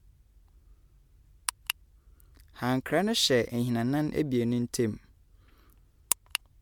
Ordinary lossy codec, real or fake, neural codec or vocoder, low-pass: MP3, 96 kbps; real; none; 19.8 kHz